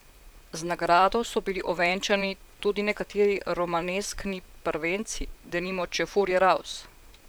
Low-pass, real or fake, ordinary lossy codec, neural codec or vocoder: none; fake; none; vocoder, 44.1 kHz, 128 mel bands, Pupu-Vocoder